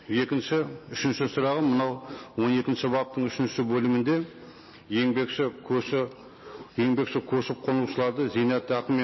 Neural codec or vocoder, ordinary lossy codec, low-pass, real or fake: none; MP3, 24 kbps; 7.2 kHz; real